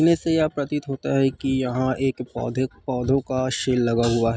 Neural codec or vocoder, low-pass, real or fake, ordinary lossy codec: none; none; real; none